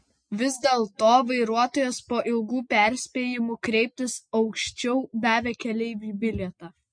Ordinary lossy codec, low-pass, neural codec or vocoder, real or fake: MP3, 48 kbps; 9.9 kHz; none; real